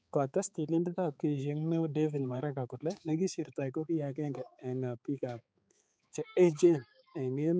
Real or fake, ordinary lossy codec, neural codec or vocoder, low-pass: fake; none; codec, 16 kHz, 4 kbps, X-Codec, HuBERT features, trained on balanced general audio; none